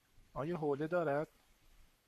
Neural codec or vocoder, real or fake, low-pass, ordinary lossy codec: codec, 44.1 kHz, 7.8 kbps, Pupu-Codec; fake; 14.4 kHz; AAC, 96 kbps